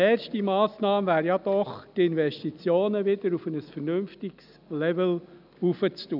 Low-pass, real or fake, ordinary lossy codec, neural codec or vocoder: 5.4 kHz; real; none; none